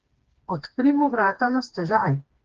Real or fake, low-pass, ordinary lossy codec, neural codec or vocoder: fake; 7.2 kHz; Opus, 16 kbps; codec, 16 kHz, 2 kbps, FreqCodec, smaller model